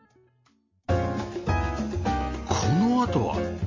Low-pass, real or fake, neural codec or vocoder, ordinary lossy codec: 7.2 kHz; real; none; MP3, 32 kbps